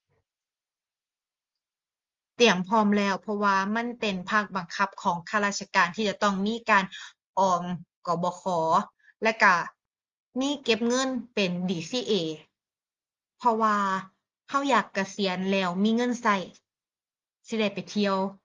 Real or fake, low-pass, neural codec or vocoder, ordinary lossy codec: real; 7.2 kHz; none; Opus, 32 kbps